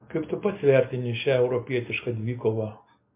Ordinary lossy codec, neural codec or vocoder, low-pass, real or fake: MP3, 24 kbps; none; 3.6 kHz; real